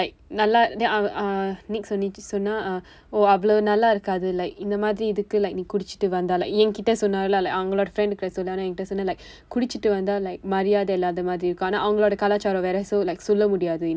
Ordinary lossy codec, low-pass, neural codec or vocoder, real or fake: none; none; none; real